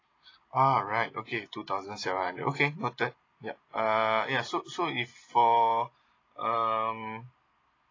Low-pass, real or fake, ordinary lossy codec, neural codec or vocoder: 7.2 kHz; real; AAC, 32 kbps; none